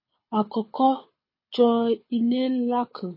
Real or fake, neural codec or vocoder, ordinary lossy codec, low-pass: fake; codec, 24 kHz, 6 kbps, HILCodec; MP3, 24 kbps; 5.4 kHz